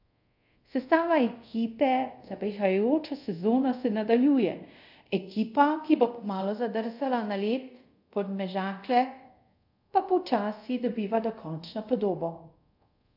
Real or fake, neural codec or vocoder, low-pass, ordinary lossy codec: fake; codec, 24 kHz, 0.5 kbps, DualCodec; 5.4 kHz; AAC, 48 kbps